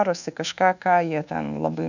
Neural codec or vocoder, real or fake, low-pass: codec, 24 kHz, 1.2 kbps, DualCodec; fake; 7.2 kHz